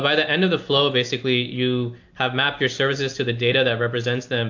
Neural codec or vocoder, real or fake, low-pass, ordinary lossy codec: none; real; 7.2 kHz; AAC, 48 kbps